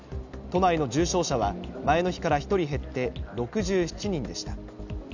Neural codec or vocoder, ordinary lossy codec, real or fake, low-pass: none; none; real; 7.2 kHz